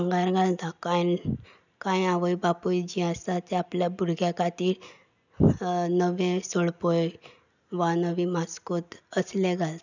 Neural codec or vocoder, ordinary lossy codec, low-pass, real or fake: none; none; 7.2 kHz; real